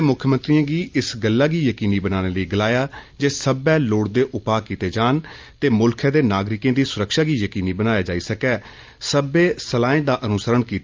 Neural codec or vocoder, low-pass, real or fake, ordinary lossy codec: none; 7.2 kHz; real; Opus, 24 kbps